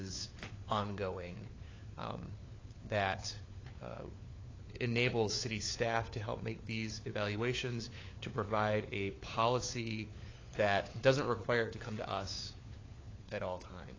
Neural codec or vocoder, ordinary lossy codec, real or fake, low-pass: codec, 16 kHz, 4 kbps, FunCodec, trained on LibriTTS, 50 frames a second; AAC, 32 kbps; fake; 7.2 kHz